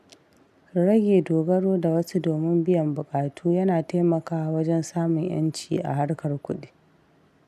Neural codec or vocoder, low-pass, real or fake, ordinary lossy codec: none; 14.4 kHz; real; none